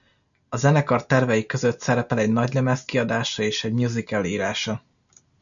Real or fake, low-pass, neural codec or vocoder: real; 7.2 kHz; none